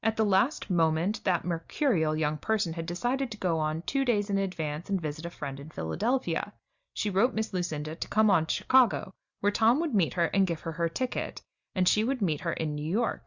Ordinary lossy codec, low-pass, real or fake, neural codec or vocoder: Opus, 64 kbps; 7.2 kHz; real; none